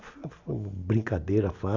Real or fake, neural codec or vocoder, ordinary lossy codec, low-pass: real; none; MP3, 64 kbps; 7.2 kHz